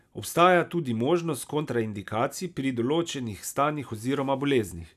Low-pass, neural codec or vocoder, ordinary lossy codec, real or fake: 14.4 kHz; none; none; real